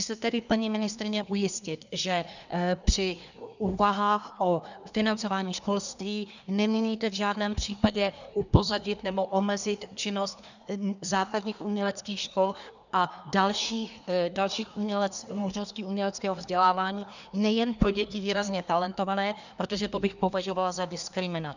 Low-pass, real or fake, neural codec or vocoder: 7.2 kHz; fake; codec, 24 kHz, 1 kbps, SNAC